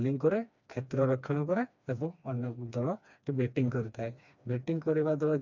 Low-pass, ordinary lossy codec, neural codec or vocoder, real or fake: 7.2 kHz; none; codec, 16 kHz, 2 kbps, FreqCodec, smaller model; fake